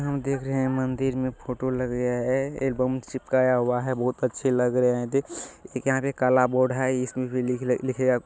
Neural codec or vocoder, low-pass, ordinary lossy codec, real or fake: none; none; none; real